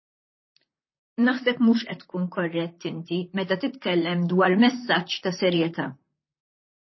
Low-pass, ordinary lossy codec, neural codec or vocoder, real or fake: 7.2 kHz; MP3, 24 kbps; vocoder, 44.1 kHz, 128 mel bands, Pupu-Vocoder; fake